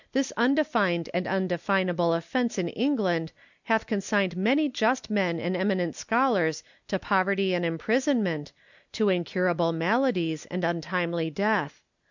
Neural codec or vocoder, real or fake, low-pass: none; real; 7.2 kHz